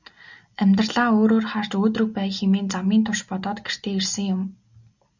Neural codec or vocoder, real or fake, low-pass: none; real; 7.2 kHz